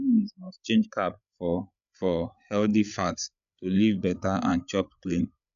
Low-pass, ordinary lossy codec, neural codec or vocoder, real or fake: 7.2 kHz; none; codec, 16 kHz, 8 kbps, FreqCodec, larger model; fake